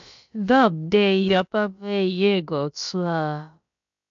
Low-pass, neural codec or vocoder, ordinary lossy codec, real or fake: 7.2 kHz; codec, 16 kHz, about 1 kbps, DyCAST, with the encoder's durations; MP3, 64 kbps; fake